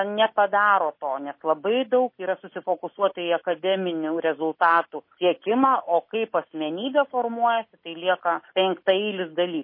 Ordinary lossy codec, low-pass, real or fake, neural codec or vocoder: MP3, 24 kbps; 5.4 kHz; real; none